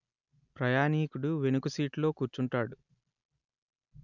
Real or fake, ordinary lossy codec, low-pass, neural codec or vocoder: real; none; 7.2 kHz; none